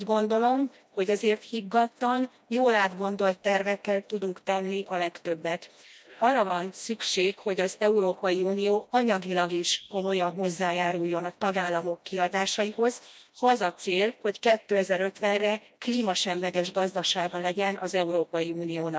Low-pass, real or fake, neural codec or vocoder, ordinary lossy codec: none; fake; codec, 16 kHz, 1 kbps, FreqCodec, smaller model; none